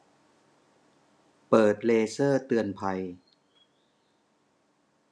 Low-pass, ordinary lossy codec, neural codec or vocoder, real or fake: none; none; none; real